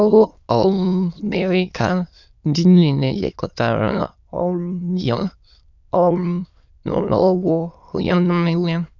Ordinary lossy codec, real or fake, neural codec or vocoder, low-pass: none; fake; autoencoder, 22.05 kHz, a latent of 192 numbers a frame, VITS, trained on many speakers; 7.2 kHz